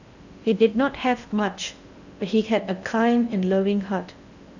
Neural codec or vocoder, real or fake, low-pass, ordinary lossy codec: codec, 16 kHz in and 24 kHz out, 0.8 kbps, FocalCodec, streaming, 65536 codes; fake; 7.2 kHz; none